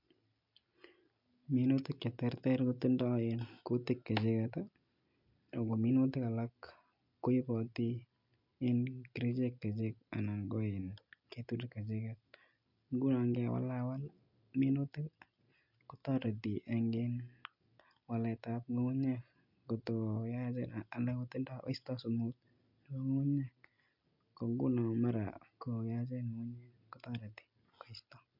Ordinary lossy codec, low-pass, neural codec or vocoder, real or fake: MP3, 48 kbps; 5.4 kHz; none; real